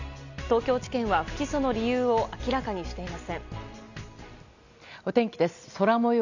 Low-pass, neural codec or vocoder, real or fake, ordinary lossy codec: 7.2 kHz; none; real; none